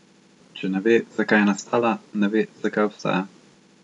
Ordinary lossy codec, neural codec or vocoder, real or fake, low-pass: none; none; real; 10.8 kHz